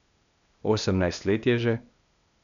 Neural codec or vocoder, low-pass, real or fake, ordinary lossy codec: codec, 16 kHz, 0.8 kbps, ZipCodec; 7.2 kHz; fake; none